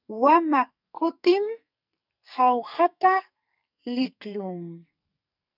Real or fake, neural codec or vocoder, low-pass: fake; codec, 44.1 kHz, 2.6 kbps, SNAC; 5.4 kHz